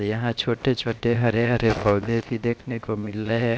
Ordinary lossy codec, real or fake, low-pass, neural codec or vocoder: none; fake; none; codec, 16 kHz, 0.7 kbps, FocalCodec